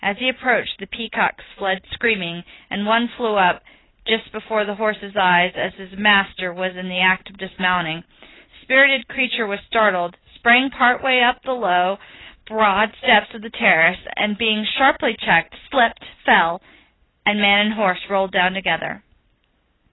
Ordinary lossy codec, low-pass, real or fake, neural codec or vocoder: AAC, 16 kbps; 7.2 kHz; real; none